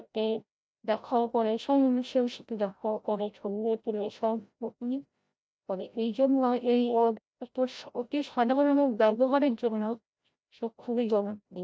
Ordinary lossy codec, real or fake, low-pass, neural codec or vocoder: none; fake; none; codec, 16 kHz, 0.5 kbps, FreqCodec, larger model